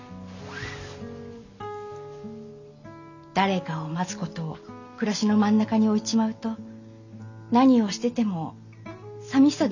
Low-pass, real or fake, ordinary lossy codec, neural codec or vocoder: 7.2 kHz; real; none; none